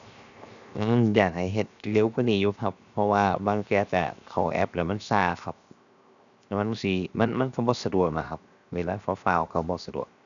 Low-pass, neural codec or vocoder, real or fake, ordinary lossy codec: 7.2 kHz; codec, 16 kHz, 0.7 kbps, FocalCodec; fake; none